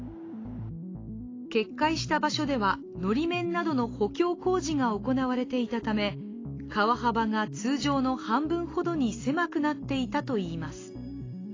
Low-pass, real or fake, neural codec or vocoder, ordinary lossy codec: 7.2 kHz; real; none; AAC, 32 kbps